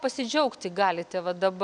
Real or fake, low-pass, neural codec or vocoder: real; 9.9 kHz; none